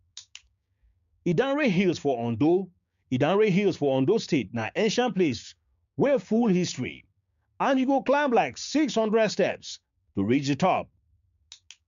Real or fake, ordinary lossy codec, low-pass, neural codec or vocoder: fake; MP3, 64 kbps; 7.2 kHz; codec, 16 kHz, 6 kbps, DAC